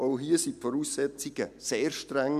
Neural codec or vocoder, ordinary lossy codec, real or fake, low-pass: none; none; real; 14.4 kHz